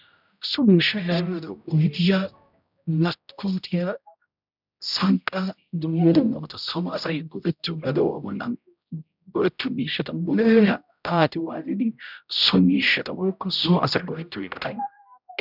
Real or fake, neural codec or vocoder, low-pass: fake; codec, 16 kHz, 0.5 kbps, X-Codec, HuBERT features, trained on general audio; 5.4 kHz